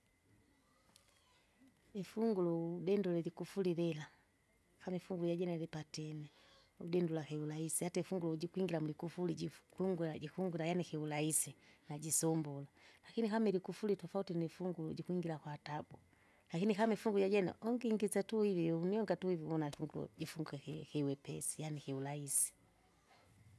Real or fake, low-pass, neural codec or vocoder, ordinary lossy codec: real; none; none; none